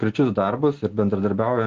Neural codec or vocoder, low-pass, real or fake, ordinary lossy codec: none; 7.2 kHz; real; Opus, 16 kbps